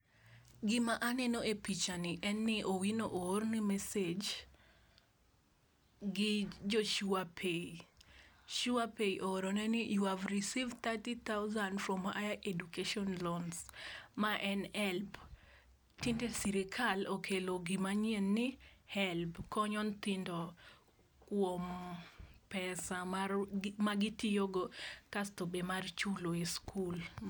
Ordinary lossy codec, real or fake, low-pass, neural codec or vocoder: none; real; none; none